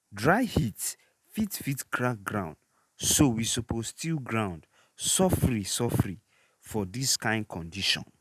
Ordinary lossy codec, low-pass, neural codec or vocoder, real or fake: none; 14.4 kHz; none; real